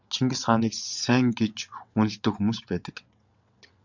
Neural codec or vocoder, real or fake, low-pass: vocoder, 22.05 kHz, 80 mel bands, WaveNeXt; fake; 7.2 kHz